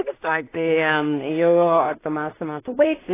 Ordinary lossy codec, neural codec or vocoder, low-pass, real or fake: AAC, 16 kbps; codec, 16 kHz in and 24 kHz out, 0.4 kbps, LongCat-Audio-Codec, two codebook decoder; 3.6 kHz; fake